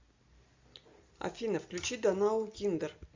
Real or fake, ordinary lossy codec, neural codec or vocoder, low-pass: real; MP3, 64 kbps; none; 7.2 kHz